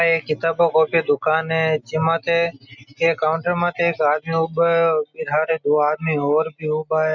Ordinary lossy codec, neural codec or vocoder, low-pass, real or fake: none; none; 7.2 kHz; real